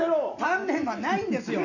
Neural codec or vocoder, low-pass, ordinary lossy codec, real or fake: none; 7.2 kHz; none; real